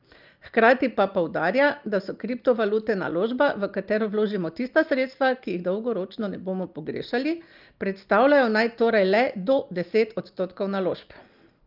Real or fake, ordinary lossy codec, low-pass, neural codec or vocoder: real; Opus, 24 kbps; 5.4 kHz; none